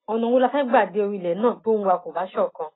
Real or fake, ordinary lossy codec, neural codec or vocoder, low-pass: real; AAC, 16 kbps; none; 7.2 kHz